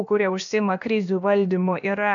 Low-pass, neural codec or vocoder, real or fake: 7.2 kHz; codec, 16 kHz, about 1 kbps, DyCAST, with the encoder's durations; fake